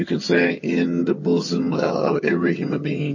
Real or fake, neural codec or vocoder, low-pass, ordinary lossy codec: fake; vocoder, 22.05 kHz, 80 mel bands, HiFi-GAN; 7.2 kHz; MP3, 32 kbps